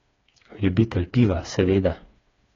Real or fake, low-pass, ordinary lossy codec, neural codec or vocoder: fake; 7.2 kHz; AAC, 32 kbps; codec, 16 kHz, 4 kbps, FreqCodec, smaller model